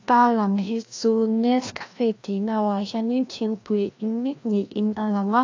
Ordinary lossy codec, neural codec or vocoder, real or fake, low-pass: AAC, 48 kbps; codec, 16 kHz, 1 kbps, FreqCodec, larger model; fake; 7.2 kHz